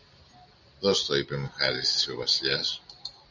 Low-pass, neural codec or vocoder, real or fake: 7.2 kHz; none; real